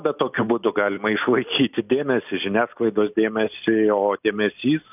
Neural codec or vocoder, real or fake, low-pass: none; real; 3.6 kHz